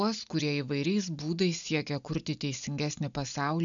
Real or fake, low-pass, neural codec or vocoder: real; 7.2 kHz; none